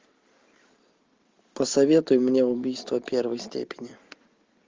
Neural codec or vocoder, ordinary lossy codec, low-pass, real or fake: none; Opus, 32 kbps; 7.2 kHz; real